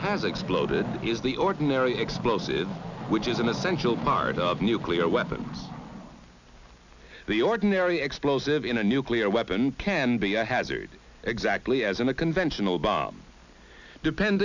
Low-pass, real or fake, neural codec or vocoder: 7.2 kHz; real; none